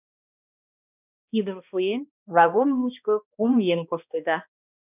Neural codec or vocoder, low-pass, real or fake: codec, 16 kHz, 1 kbps, X-Codec, HuBERT features, trained on balanced general audio; 3.6 kHz; fake